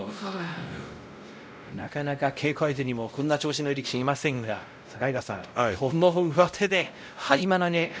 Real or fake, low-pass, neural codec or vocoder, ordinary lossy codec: fake; none; codec, 16 kHz, 0.5 kbps, X-Codec, WavLM features, trained on Multilingual LibriSpeech; none